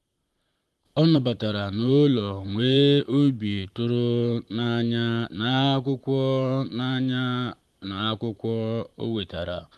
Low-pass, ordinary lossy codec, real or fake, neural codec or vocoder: 14.4 kHz; Opus, 32 kbps; fake; vocoder, 44.1 kHz, 128 mel bands, Pupu-Vocoder